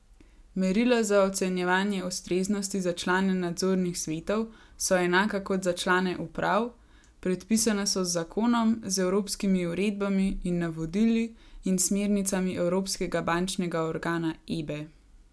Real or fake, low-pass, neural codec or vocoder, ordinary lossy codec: real; none; none; none